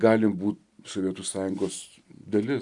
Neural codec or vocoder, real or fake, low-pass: none; real; 10.8 kHz